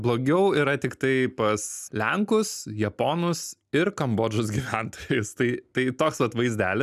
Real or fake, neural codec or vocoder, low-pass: real; none; 14.4 kHz